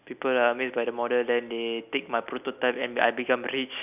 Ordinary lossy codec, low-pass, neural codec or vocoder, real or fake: none; 3.6 kHz; none; real